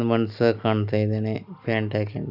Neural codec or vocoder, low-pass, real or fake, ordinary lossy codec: none; 5.4 kHz; real; none